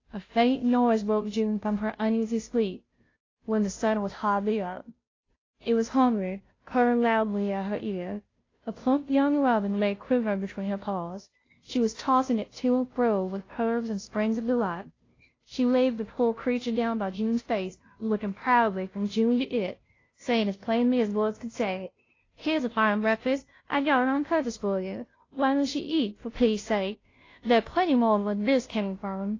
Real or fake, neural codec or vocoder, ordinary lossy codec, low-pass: fake; codec, 16 kHz, 0.5 kbps, FunCodec, trained on Chinese and English, 25 frames a second; AAC, 32 kbps; 7.2 kHz